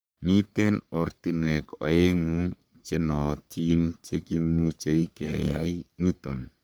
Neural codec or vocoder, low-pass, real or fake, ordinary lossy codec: codec, 44.1 kHz, 3.4 kbps, Pupu-Codec; none; fake; none